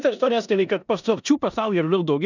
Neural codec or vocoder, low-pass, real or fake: codec, 16 kHz in and 24 kHz out, 0.9 kbps, LongCat-Audio-Codec, four codebook decoder; 7.2 kHz; fake